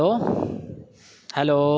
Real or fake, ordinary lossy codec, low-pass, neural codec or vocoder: real; none; none; none